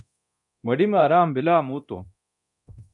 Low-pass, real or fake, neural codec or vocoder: 10.8 kHz; fake; codec, 24 kHz, 0.9 kbps, DualCodec